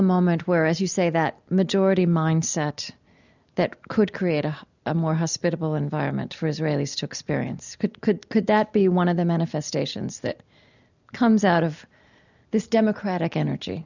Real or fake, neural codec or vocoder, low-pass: real; none; 7.2 kHz